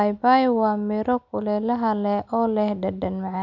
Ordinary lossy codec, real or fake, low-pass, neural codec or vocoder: none; real; 7.2 kHz; none